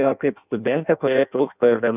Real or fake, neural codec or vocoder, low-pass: fake; codec, 16 kHz in and 24 kHz out, 0.6 kbps, FireRedTTS-2 codec; 3.6 kHz